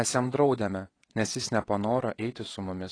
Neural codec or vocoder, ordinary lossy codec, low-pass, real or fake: vocoder, 22.05 kHz, 80 mel bands, WaveNeXt; AAC, 32 kbps; 9.9 kHz; fake